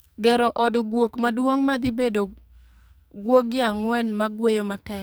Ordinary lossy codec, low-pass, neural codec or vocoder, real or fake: none; none; codec, 44.1 kHz, 2.6 kbps, SNAC; fake